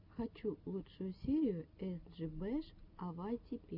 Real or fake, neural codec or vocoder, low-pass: real; none; 5.4 kHz